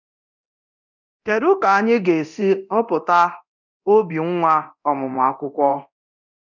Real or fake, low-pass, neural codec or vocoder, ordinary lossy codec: fake; 7.2 kHz; codec, 24 kHz, 0.9 kbps, DualCodec; none